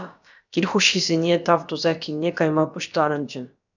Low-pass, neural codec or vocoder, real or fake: 7.2 kHz; codec, 16 kHz, about 1 kbps, DyCAST, with the encoder's durations; fake